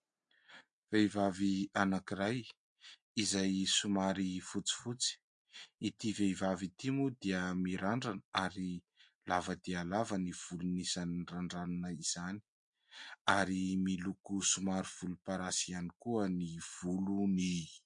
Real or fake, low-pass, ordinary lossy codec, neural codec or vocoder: real; 9.9 kHz; MP3, 48 kbps; none